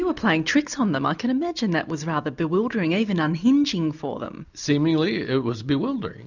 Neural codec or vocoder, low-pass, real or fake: none; 7.2 kHz; real